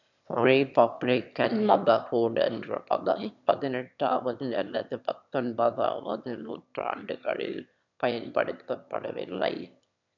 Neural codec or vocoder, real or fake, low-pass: autoencoder, 22.05 kHz, a latent of 192 numbers a frame, VITS, trained on one speaker; fake; 7.2 kHz